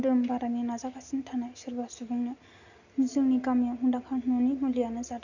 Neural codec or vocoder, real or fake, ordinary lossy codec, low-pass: none; real; none; 7.2 kHz